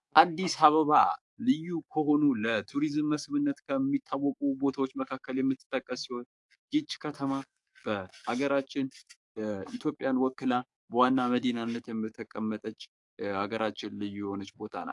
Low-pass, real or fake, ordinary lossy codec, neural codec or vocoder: 10.8 kHz; fake; AAC, 64 kbps; codec, 44.1 kHz, 7.8 kbps, DAC